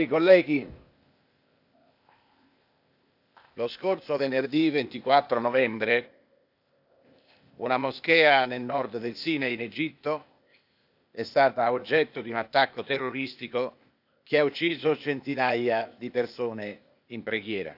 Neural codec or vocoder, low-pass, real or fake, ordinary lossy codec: codec, 16 kHz, 0.8 kbps, ZipCodec; 5.4 kHz; fake; none